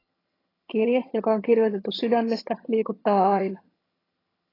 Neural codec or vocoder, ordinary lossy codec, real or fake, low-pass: vocoder, 22.05 kHz, 80 mel bands, HiFi-GAN; AAC, 24 kbps; fake; 5.4 kHz